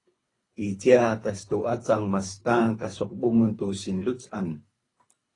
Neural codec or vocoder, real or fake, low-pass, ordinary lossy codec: codec, 24 kHz, 3 kbps, HILCodec; fake; 10.8 kHz; AAC, 32 kbps